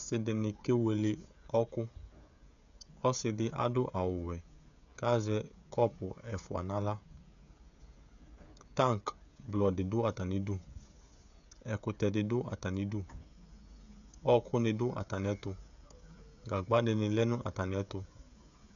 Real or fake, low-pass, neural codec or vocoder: fake; 7.2 kHz; codec, 16 kHz, 16 kbps, FreqCodec, smaller model